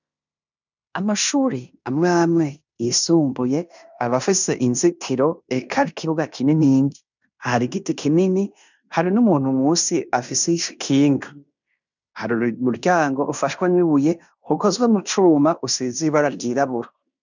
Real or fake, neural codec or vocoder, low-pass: fake; codec, 16 kHz in and 24 kHz out, 0.9 kbps, LongCat-Audio-Codec, fine tuned four codebook decoder; 7.2 kHz